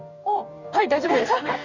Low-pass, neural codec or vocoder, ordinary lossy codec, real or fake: 7.2 kHz; codec, 44.1 kHz, 2.6 kbps, DAC; none; fake